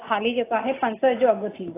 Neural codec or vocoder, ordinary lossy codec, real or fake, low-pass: none; AAC, 16 kbps; real; 3.6 kHz